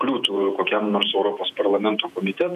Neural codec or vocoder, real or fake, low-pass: vocoder, 48 kHz, 128 mel bands, Vocos; fake; 14.4 kHz